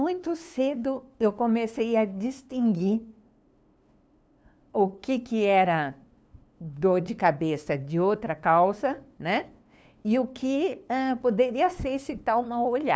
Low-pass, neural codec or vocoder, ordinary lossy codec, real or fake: none; codec, 16 kHz, 2 kbps, FunCodec, trained on LibriTTS, 25 frames a second; none; fake